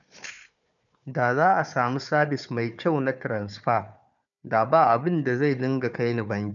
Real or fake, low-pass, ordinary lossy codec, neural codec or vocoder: fake; 7.2 kHz; none; codec, 16 kHz, 4 kbps, FunCodec, trained on Chinese and English, 50 frames a second